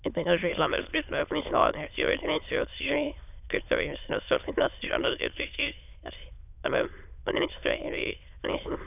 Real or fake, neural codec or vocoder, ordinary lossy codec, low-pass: fake; autoencoder, 22.05 kHz, a latent of 192 numbers a frame, VITS, trained on many speakers; none; 3.6 kHz